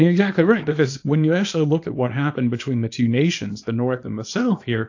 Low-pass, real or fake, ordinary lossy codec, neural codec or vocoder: 7.2 kHz; fake; AAC, 48 kbps; codec, 24 kHz, 0.9 kbps, WavTokenizer, small release